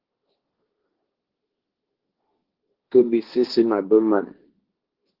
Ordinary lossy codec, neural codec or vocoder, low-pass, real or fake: Opus, 16 kbps; codec, 16 kHz, 1.1 kbps, Voila-Tokenizer; 5.4 kHz; fake